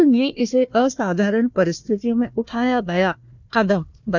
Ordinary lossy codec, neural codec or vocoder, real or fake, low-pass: none; codec, 16 kHz, 1 kbps, FunCodec, trained on Chinese and English, 50 frames a second; fake; 7.2 kHz